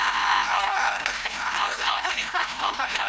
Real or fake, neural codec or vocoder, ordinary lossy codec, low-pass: fake; codec, 16 kHz, 0.5 kbps, FreqCodec, larger model; none; none